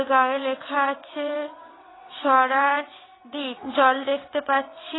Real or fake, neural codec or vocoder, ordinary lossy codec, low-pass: fake; vocoder, 22.05 kHz, 80 mel bands, WaveNeXt; AAC, 16 kbps; 7.2 kHz